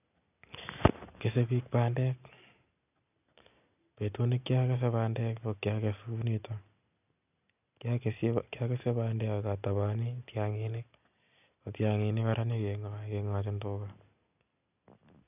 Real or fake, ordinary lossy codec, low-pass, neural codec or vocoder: real; none; 3.6 kHz; none